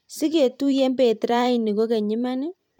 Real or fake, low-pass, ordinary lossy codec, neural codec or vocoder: fake; 19.8 kHz; none; vocoder, 44.1 kHz, 128 mel bands every 512 samples, BigVGAN v2